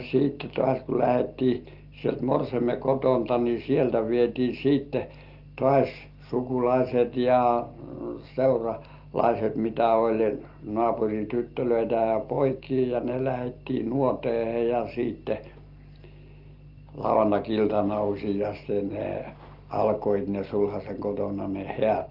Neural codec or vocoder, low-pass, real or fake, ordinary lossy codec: none; 5.4 kHz; real; Opus, 32 kbps